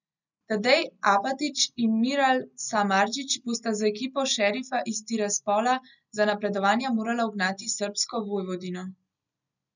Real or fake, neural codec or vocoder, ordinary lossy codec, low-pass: real; none; none; 7.2 kHz